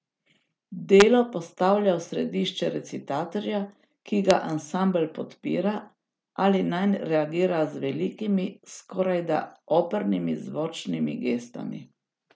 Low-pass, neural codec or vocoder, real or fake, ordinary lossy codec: none; none; real; none